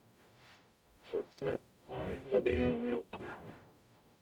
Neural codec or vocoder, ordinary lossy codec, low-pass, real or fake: codec, 44.1 kHz, 0.9 kbps, DAC; none; 19.8 kHz; fake